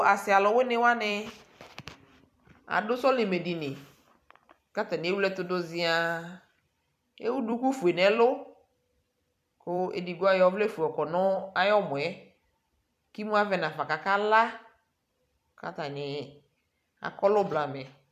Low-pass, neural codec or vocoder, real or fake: 14.4 kHz; vocoder, 44.1 kHz, 128 mel bands every 256 samples, BigVGAN v2; fake